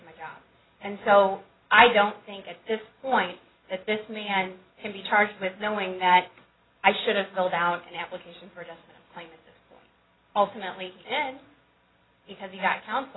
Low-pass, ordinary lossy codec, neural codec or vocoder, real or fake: 7.2 kHz; AAC, 16 kbps; none; real